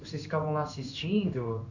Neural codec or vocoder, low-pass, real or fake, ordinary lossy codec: codec, 16 kHz in and 24 kHz out, 1 kbps, XY-Tokenizer; 7.2 kHz; fake; none